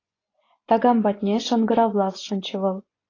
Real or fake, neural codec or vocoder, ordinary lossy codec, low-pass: fake; vocoder, 24 kHz, 100 mel bands, Vocos; AAC, 32 kbps; 7.2 kHz